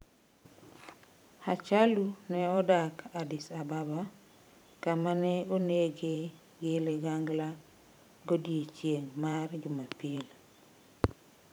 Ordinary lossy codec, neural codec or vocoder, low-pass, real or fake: none; vocoder, 44.1 kHz, 128 mel bands every 512 samples, BigVGAN v2; none; fake